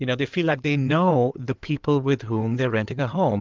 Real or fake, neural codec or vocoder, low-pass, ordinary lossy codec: fake; codec, 16 kHz in and 24 kHz out, 2.2 kbps, FireRedTTS-2 codec; 7.2 kHz; Opus, 24 kbps